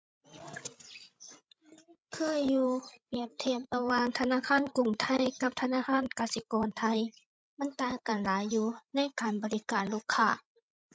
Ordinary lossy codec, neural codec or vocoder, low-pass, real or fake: none; codec, 16 kHz, 16 kbps, FreqCodec, larger model; none; fake